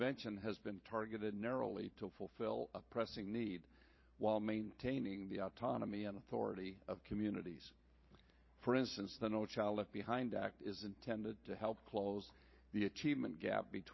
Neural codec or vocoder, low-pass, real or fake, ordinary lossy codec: vocoder, 44.1 kHz, 128 mel bands every 512 samples, BigVGAN v2; 7.2 kHz; fake; MP3, 24 kbps